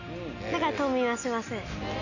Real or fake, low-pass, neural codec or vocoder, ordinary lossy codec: real; 7.2 kHz; none; none